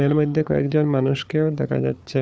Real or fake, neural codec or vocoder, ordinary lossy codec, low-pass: fake; codec, 16 kHz, 4 kbps, FunCodec, trained on Chinese and English, 50 frames a second; none; none